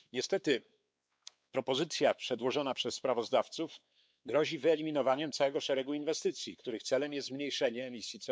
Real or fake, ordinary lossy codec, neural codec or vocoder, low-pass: fake; none; codec, 16 kHz, 4 kbps, X-Codec, WavLM features, trained on Multilingual LibriSpeech; none